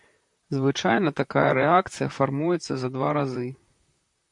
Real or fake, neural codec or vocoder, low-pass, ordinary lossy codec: fake; vocoder, 44.1 kHz, 128 mel bands, Pupu-Vocoder; 10.8 kHz; MP3, 64 kbps